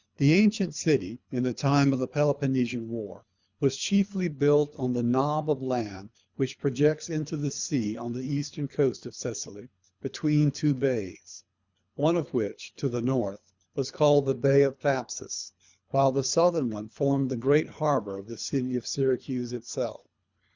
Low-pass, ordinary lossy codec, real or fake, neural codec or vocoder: 7.2 kHz; Opus, 64 kbps; fake; codec, 24 kHz, 3 kbps, HILCodec